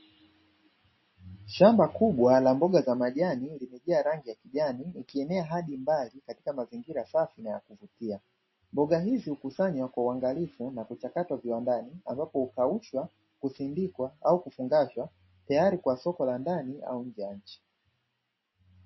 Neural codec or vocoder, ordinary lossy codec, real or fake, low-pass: none; MP3, 24 kbps; real; 7.2 kHz